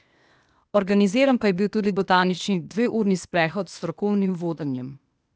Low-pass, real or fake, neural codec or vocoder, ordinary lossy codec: none; fake; codec, 16 kHz, 0.8 kbps, ZipCodec; none